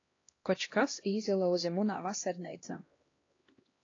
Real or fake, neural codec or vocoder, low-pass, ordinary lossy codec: fake; codec, 16 kHz, 1 kbps, X-Codec, HuBERT features, trained on LibriSpeech; 7.2 kHz; AAC, 32 kbps